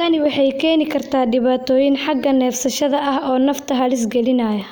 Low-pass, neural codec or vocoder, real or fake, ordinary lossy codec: none; none; real; none